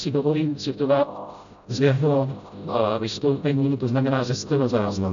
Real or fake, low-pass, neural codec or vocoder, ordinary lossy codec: fake; 7.2 kHz; codec, 16 kHz, 0.5 kbps, FreqCodec, smaller model; MP3, 64 kbps